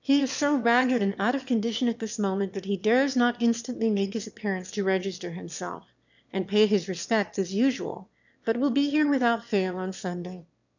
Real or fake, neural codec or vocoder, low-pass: fake; autoencoder, 22.05 kHz, a latent of 192 numbers a frame, VITS, trained on one speaker; 7.2 kHz